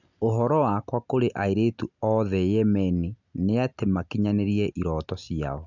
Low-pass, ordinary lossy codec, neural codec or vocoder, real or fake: 7.2 kHz; none; none; real